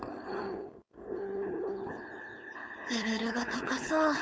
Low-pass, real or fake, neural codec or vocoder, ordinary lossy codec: none; fake; codec, 16 kHz, 4.8 kbps, FACodec; none